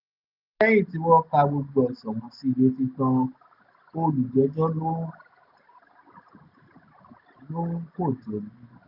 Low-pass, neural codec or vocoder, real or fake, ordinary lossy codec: 5.4 kHz; none; real; none